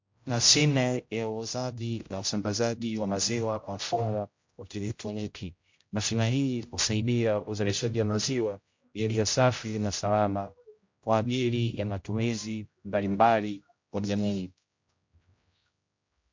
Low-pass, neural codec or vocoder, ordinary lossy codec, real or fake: 7.2 kHz; codec, 16 kHz, 0.5 kbps, X-Codec, HuBERT features, trained on general audio; MP3, 48 kbps; fake